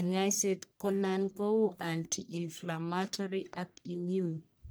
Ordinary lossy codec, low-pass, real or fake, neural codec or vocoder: none; none; fake; codec, 44.1 kHz, 1.7 kbps, Pupu-Codec